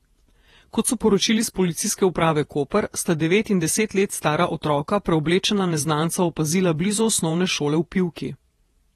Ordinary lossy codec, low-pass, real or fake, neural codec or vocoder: AAC, 32 kbps; 19.8 kHz; fake; vocoder, 48 kHz, 128 mel bands, Vocos